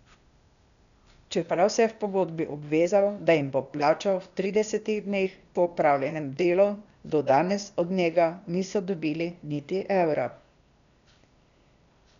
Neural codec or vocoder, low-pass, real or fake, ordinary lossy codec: codec, 16 kHz, 0.8 kbps, ZipCodec; 7.2 kHz; fake; none